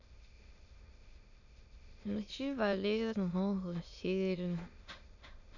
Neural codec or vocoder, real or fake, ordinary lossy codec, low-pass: autoencoder, 22.05 kHz, a latent of 192 numbers a frame, VITS, trained on many speakers; fake; none; 7.2 kHz